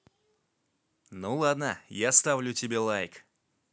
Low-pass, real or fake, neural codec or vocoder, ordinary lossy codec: none; real; none; none